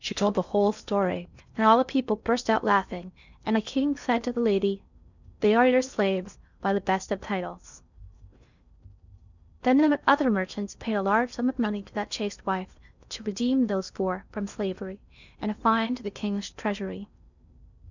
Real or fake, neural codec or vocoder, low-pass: fake; codec, 16 kHz in and 24 kHz out, 0.6 kbps, FocalCodec, streaming, 4096 codes; 7.2 kHz